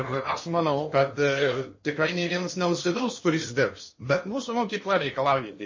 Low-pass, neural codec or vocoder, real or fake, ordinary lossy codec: 7.2 kHz; codec, 16 kHz in and 24 kHz out, 0.8 kbps, FocalCodec, streaming, 65536 codes; fake; MP3, 32 kbps